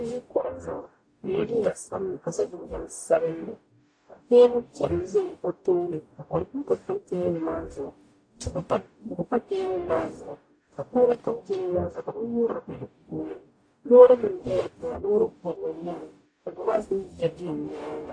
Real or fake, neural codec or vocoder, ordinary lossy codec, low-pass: fake; codec, 44.1 kHz, 0.9 kbps, DAC; AAC, 48 kbps; 9.9 kHz